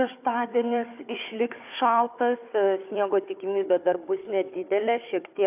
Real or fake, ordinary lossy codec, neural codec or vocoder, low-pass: fake; AAC, 32 kbps; codec, 16 kHz, 4 kbps, FunCodec, trained on Chinese and English, 50 frames a second; 3.6 kHz